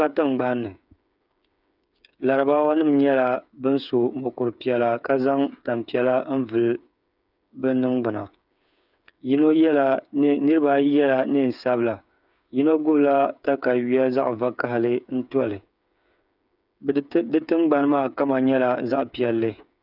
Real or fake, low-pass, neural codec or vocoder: fake; 5.4 kHz; codec, 16 kHz, 8 kbps, FreqCodec, smaller model